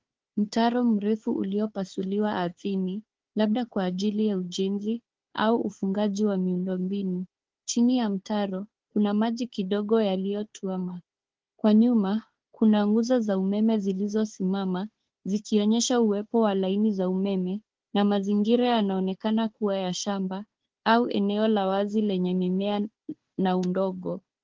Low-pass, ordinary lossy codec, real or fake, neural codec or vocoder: 7.2 kHz; Opus, 16 kbps; fake; codec, 16 kHz, 4 kbps, FunCodec, trained on Chinese and English, 50 frames a second